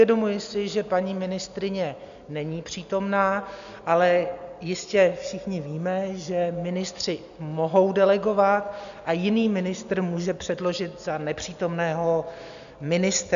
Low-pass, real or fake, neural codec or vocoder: 7.2 kHz; real; none